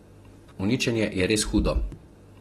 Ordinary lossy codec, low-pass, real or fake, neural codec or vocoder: AAC, 32 kbps; 19.8 kHz; real; none